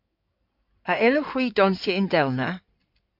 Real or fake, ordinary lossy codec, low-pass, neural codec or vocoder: fake; MP3, 48 kbps; 5.4 kHz; codec, 16 kHz in and 24 kHz out, 2.2 kbps, FireRedTTS-2 codec